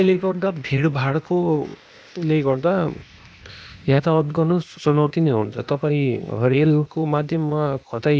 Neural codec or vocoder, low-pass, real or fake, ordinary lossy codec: codec, 16 kHz, 0.8 kbps, ZipCodec; none; fake; none